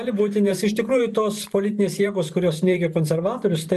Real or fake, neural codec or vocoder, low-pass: fake; vocoder, 44.1 kHz, 128 mel bands every 512 samples, BigVGAN v2; 14.4 kHz